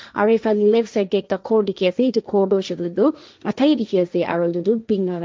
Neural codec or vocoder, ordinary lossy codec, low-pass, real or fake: codec, 16 kHz, 1.1 kbps, Voila-Tokenizer; none; none; fake